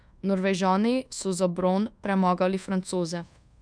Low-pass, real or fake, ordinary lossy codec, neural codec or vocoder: 9.9 kHz; fake; none; codec, 24 kHz, 1.2 kbps, DualCodec